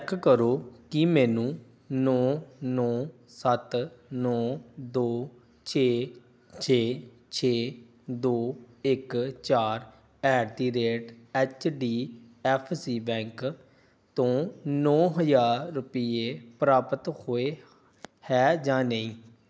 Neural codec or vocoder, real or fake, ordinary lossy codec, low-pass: none; real; none; none